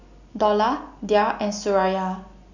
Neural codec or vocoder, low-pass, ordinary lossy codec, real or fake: none; 7.2 kHz; none; real